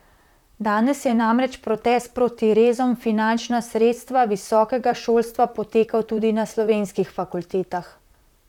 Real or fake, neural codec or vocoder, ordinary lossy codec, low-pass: fake; vocoder, 44.1 kHz, 128 mel bands, Pupu-Vocoder; none; 19.8 kHz